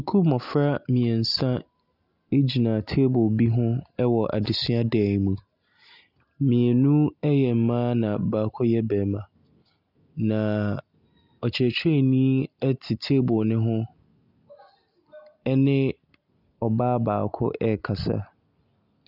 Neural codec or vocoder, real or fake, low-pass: none; real; 5.4 kHz